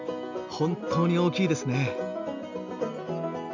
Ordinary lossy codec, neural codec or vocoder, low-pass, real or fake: none; none; 7.2 kHz; real